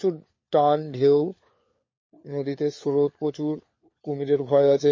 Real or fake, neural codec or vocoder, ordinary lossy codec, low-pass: fake; codec, 16 kHz, 4 kbps, FunCodec, trained on LibriTTS, 50 frames a second; MP3, 32 kbps; 7.2 kHz